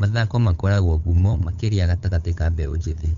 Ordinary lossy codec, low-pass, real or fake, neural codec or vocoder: none; 7.2 kHz; fake; codec, 16 kHz, 8 kbps, FunCodec, trained on LibriTTS, 25 frames a second